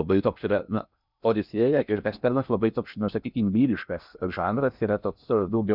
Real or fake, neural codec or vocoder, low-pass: fake; codec, 16 kHz in and 24 kHz out, 0.6 kbps, FocalCodec, streaming, 4096 codes; 5.4 kHz